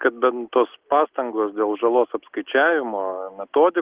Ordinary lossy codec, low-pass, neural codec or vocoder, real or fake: Opus, 16 kbps; 3.6 kHz; none; real